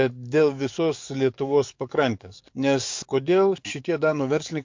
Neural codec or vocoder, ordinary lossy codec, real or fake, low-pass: codec, 44.1 kHz, 7.8 kbps, DAC; MP3, 48 kbps; fake; 7.2 kHz